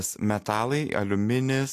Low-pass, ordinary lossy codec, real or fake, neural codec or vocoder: 14.4 kHz; AAC, 64 kbps; real; none